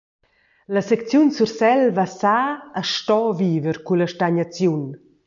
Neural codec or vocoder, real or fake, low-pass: none; real; 7.2 kHz